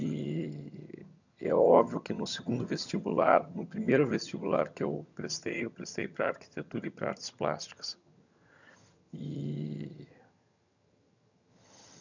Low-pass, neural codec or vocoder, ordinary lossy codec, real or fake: 7.2 kHz; vocoder, 22.05 kHz, 80 mel bands, HiFi-GAN; none; fake